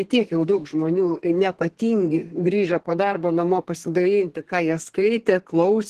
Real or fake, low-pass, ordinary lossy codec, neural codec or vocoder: fake; 14.4 kHz; Opus, 16 kbps; codec, 32 kHz, 1.9 kbps, SNAC